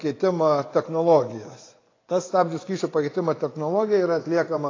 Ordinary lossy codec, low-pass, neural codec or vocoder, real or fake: AAC, 32 kbps; 7.2 kHz; vocoder, 24 kHz, 100 mel bands, Vocos; fake